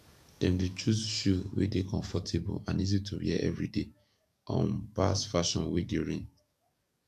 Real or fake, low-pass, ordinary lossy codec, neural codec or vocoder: fake; 14.4 kHz; none; codec, 44.1 kHz, 7.8 kbps, DAC